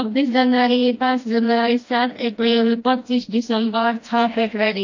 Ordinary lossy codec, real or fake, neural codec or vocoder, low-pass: AAC, 48 kbps; fake; codec, 16 kHz, 1 kbps, FreqCodec, smaller model; 7.2 kHz